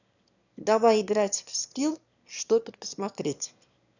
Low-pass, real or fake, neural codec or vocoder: 7.2 kHz; fake; autoencoder, 22.05 kHz, a latent of 192 numbers a frame, VITS, trained on one speaker